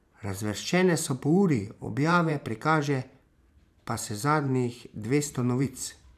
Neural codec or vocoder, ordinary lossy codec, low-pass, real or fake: vocoder, 44.1 kHz, 128 mel bands every 512 samples, BigVGAN v2; none; 14.4 kHz; fake